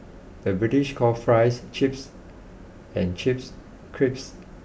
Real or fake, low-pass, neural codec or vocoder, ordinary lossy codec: real; none; none; none